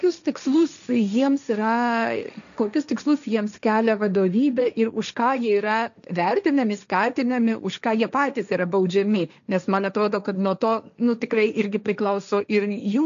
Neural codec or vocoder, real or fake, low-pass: codec, 16 kHz, 1.1 kbps, Voila-Tokenizer; fake; 7.2 kHz